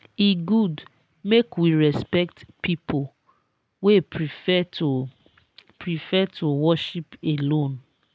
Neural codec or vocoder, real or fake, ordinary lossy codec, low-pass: none; real; none; none